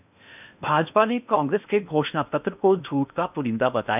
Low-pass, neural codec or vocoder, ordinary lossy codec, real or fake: 3.6 kHz; codec, 16 kHz in and 24 kHz out, 0.8 kbps, FocalCodec, streaming, 65536 codes; none; fake